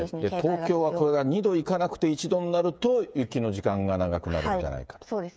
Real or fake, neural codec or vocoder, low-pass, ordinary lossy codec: fake; codec, 16 kHz, 8 kbps, FreqCodec, smaller model; none; none